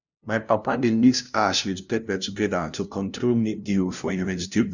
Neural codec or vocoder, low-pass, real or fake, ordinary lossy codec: codec, 16 kHz, 0.5 kbps, FunCodec, trained on LibriTTS, 25 frames a second; 7.2 kHz; fake; none